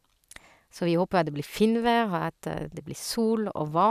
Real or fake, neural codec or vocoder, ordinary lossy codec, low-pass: real; none; none; 14.4 kHz